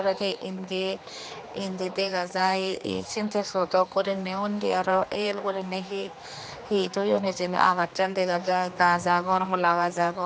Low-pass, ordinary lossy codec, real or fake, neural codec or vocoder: none; none; fake; codec, 16 kHz, 2 kbps, X-Codec, HuBERT features, trained on general audio